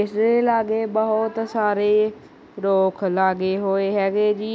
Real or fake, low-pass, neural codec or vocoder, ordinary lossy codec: real; none; none; none